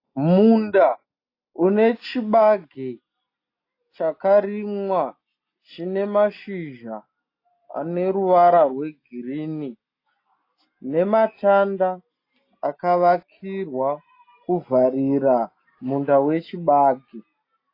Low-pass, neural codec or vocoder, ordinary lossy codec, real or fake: 5.4 kHz; none; AAC, 32 kbps; real